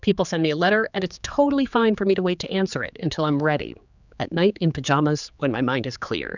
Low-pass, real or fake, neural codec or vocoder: 7.2 kHz; fake; codec, 16 kHz, 4 kbps, X-Codec, HuBERT features, trained on general audio